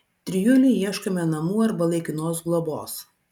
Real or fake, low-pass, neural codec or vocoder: real; 19.8 kHz; none